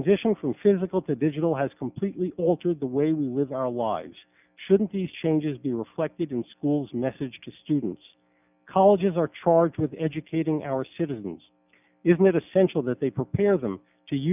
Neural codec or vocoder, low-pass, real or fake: none; 3.6 kHz; real